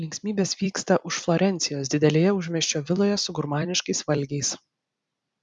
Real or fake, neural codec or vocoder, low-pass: fake; vocoder, 44.1 kHz, 128 mel bands every 256 samples, BigVGAN v2; 10.8 kHz